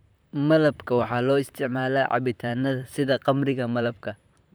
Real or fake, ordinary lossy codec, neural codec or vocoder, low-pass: fake; none; vocoder, 44.1 kHz, 128 mel bands, Pupu-Vocoder; none